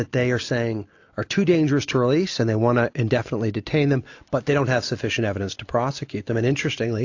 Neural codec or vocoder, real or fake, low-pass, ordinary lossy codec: none; real; 7.2 kHz; AAC, 48 kbps